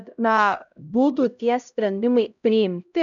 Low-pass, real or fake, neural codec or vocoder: 7.2 kHz; fake; codec, 16 kHz, 0.5 kbps, X-Codec, HuBERT features, trained on LibriSpeech